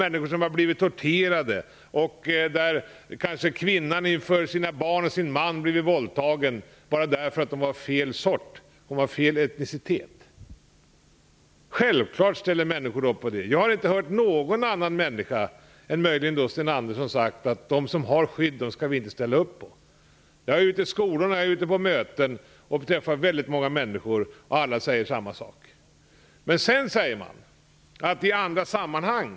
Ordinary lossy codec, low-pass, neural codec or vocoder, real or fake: none; none; none; real